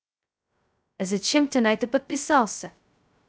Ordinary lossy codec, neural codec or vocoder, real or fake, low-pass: none; codec, 16 kHz, 0.2 kbps, FocalCodec; fake; none